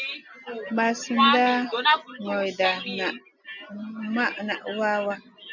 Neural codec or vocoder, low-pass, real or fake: none; 7.2 kHz; real